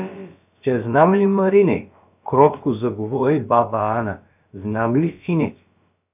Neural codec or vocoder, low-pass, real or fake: codec, 16 kHz, about 1 kbps, DyCAST, with the encoder's durations; 3.6 kHz; fake